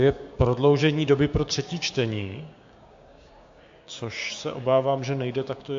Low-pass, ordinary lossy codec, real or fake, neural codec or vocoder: 7.2 kHz; MP3, 48 kbps; fake; codec, 16 kHz, 6 kbps, DAC